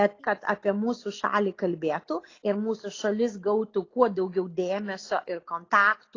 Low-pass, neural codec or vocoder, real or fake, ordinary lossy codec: 7.2 kHz; none; real; AAC, 32 kbps